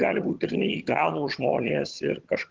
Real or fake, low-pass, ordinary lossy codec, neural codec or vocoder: fake; 7.2 kHz; Opus, 16 kbps; vocoder, 22.05 kHz, 80 mel bands, HiFi-GAN